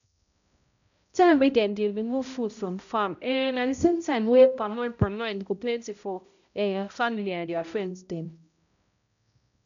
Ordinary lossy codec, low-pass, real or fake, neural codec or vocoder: none; 7.2 kHz; fake; codec, 16 kHz, 0.5 kbps, X-Codec, HuBERT features, trained on balanced general audio